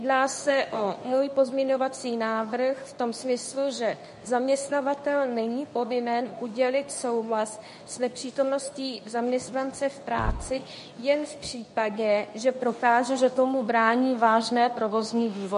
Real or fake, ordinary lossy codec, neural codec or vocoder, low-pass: fake; MP3, 48 kbps; codec, 24 kHz, 0.9 kbps, WavTokenizer, medium speech release version 2; 10.8 kHz